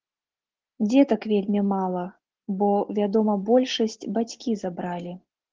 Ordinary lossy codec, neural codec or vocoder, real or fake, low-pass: Opus, 16 kbps; none; real; 7.2 kHz